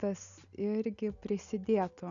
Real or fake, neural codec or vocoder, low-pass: real; none; 7.2 kHz